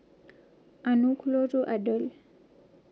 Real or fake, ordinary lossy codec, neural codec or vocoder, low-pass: real; none; none; none